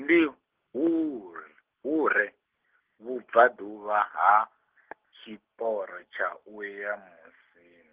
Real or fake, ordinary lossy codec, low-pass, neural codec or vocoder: real; Opus, 16 kbps; 3.6 kHz; none